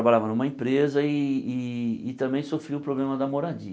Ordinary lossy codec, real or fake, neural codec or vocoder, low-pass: none; real; none; none